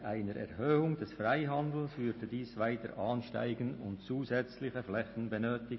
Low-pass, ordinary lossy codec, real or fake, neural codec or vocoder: 7.2 kHz; MP3, 24 kbps; real; none